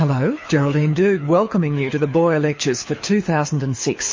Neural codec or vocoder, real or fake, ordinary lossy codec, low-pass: codec, 24 kHz, 6 kbps, HILCodec; fake; MP3, 32 kbps; 7.2 kHz